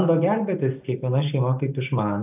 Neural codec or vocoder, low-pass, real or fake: none; 3.6 kHz; real